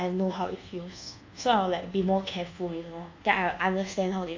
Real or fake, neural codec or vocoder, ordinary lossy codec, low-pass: fake; codec, 24 kHz, 1.2 kbps, DualCodec; AAC, 48 kbps; 7.2 kHz